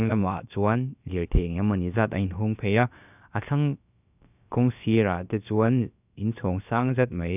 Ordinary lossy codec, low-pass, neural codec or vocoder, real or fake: none; 3.6 kHz; codec, 16 kHz, about 1 kbps, DyCAST, with the encoder's durations; fake